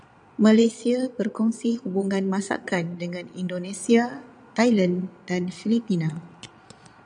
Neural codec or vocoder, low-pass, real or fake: vocoder, 22.05 kHz, 80 mel bands, Vocos; 9.9 kHz; fake